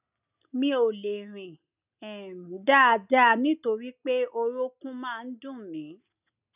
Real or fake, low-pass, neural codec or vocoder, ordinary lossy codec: real; 3.6 kHz; none; none